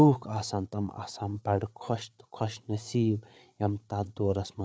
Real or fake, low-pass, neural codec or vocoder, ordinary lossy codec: fake; none; codec, 16 kHz, 8 kbps, FreqCodec, larger model; none